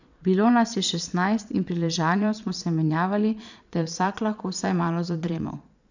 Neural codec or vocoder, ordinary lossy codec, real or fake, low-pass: vocoder, 22.05 kHz, 80 mel bands, Vocos; none; fake; 7.2 kHz